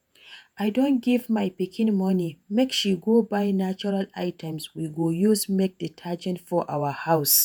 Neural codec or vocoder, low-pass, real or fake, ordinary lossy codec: vocoder, 48 kHz, 128 mel bands, Vocos; none; fake; none